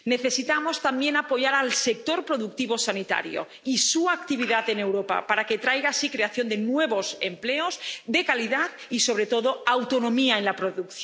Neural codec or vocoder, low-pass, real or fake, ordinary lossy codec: none; none; real; none